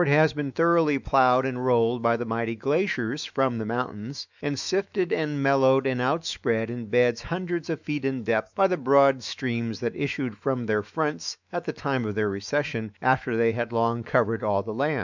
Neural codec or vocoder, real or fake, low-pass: autoencoder, 48 kHz, 128 numbers a frame, DAC-VAE, trained on Japanese speech; fake; 7.2 kHz